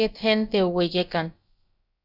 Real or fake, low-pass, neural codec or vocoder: fake; 5.4 kHz; codec, 16 kHz, about 1 kbps, DyCAST, with the encoder's durations